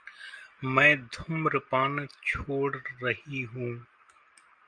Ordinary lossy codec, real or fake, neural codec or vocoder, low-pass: Opus, 32 kbps; real; none; 9.9 kHz